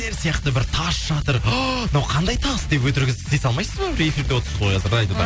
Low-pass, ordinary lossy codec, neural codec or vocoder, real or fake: none; none; none; real